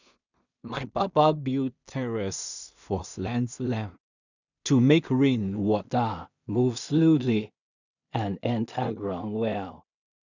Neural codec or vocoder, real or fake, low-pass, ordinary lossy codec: codec, 16 kHz in and 24 kHz out, 0.4 kbps, LongCat-Audio-Codec, two codebook decoder; fake; 7.2 kHz; none